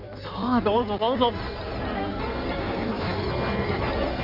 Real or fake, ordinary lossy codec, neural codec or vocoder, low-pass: fake; none; codec, 16 kHz in and 24 kHz out, 1.1 kbps, FireRedTTS-2 codec; 5.4 kHz